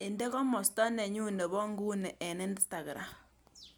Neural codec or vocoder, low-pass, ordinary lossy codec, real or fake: none; none; none; real